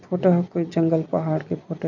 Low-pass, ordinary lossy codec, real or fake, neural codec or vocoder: 7.2 kHz; none; real; none